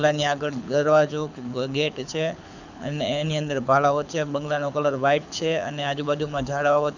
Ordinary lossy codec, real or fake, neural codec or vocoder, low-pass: none; fake; codec, 24 kHz, 6 kbps, HILCodec; 7.2 kHz